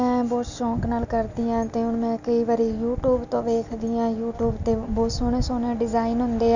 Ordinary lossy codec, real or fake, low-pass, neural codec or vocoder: none; real; 7.2 kHz; none